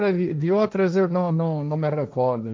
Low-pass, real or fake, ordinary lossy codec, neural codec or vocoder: none; fake; none; codec, 16 kHz, 1.1 kbps, Voila-Tokenizer